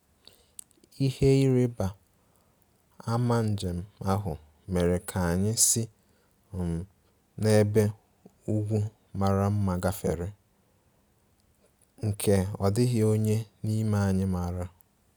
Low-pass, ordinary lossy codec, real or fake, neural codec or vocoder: none; none; real; none